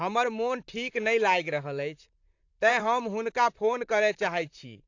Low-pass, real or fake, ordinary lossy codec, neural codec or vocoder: 7.2 kHz; fake; AAC, 48 kbps; vocoder, 44.1 kHz, 128 mel bands, Pupu-Vocoder